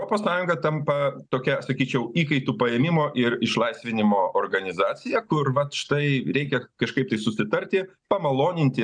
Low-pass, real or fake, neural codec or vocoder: 9.9 kHz; real; none